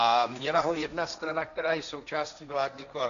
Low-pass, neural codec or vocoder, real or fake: 7.2 kHz; codec, 16 kHz, 1.1 kbps, Voila-Tokenizer; fake